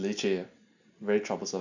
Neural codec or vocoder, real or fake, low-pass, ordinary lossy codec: none; real; 7.2 kHz; none